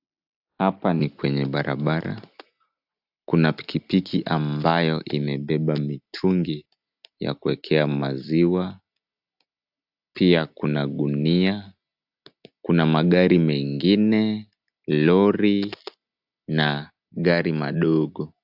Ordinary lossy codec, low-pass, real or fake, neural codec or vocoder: AAC, 48 kbps; 5.4 kHz; real; none